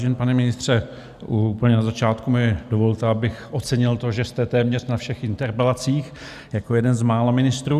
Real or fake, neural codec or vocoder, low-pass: real; none; 14.4 kHz